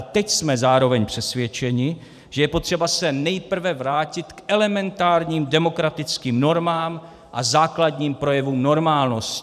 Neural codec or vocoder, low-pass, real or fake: vocoder, 44.1 kHz, 128 mel bands every 512 samples, BigVGAN v2; 14.4 kHz; fake